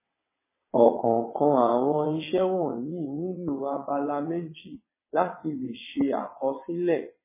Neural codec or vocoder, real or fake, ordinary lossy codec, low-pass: vocoder, 22.05 kHz, 80 mel bands, WaveNeXt; fake; MP3, 16 kbps; 3.6 kHz